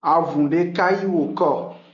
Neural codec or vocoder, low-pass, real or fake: none; 7.2 kHz; real